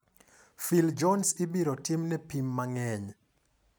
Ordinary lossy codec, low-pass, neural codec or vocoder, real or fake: none; none; none; real